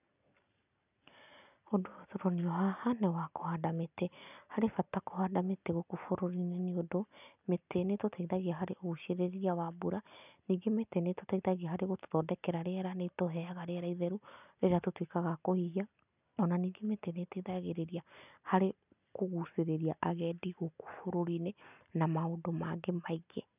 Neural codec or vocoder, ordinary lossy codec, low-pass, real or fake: none; none; 3.6 kHz; real